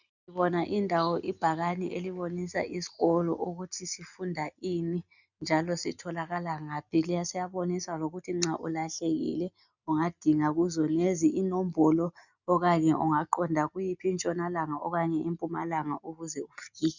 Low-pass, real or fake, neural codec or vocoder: 7.2 kHz; real; none